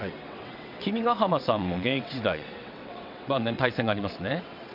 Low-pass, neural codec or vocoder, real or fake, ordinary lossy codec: 5.4 kHz; vocoder, 22.05 kHz, 80 mel bands, WaveNeXt; fake; none